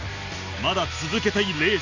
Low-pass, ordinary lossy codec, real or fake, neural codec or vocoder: 7.2 kHz; Opus, 64 kbps; real; none